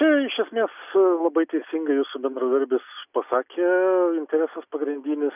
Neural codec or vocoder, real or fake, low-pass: none; real; 3.6 kHz